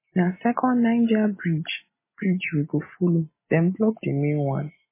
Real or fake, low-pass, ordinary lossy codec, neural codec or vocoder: real; 3.6 kHz; MP3, 16 kbps; none